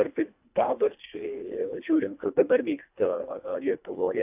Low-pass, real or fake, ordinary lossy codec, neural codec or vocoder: 3.6 kHz; fake; AAC, 32 kbps; codec, 24 kHz, 1.5 kbps, HILCodec